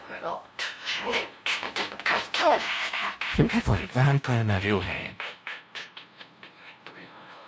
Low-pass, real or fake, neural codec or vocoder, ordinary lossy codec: none; fake; codec, 16 kHz, 0.5 kbps, FunCodec, trained on LibriTTS, 25 frames a second; none